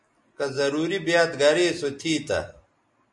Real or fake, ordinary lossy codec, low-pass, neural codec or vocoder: real; MP3, 48 kbps; 10.8 kHz; none